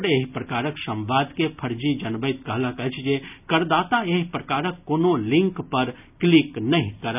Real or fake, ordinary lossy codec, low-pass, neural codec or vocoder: real; none; 3.6 kHz; none